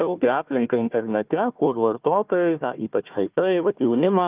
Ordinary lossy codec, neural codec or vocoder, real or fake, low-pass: Opus, 32 kbps; codec, 16 kHz, 1 kbps, FunCodec, trained on Chinese and English, 50 frames a second; fake; 3.6 kHz